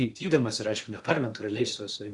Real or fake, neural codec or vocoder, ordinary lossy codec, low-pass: fake; codec, 16 kHz in and 24 kHz out, 0.8 kbps, FocalCodec, streaming, 65536 codes; Opus, 64 kbps; 10.8 kHz